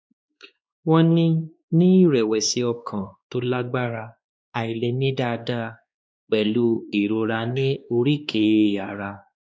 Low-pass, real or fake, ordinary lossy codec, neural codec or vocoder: none; fake; none; codec, 16 kHz, 2 kbps, X-Codec, WavLM features, trained on Multilingual LibriSpeech